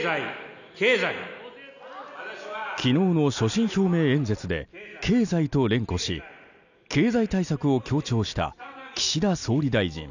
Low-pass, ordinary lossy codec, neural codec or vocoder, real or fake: 7.2 kHz; none; none; real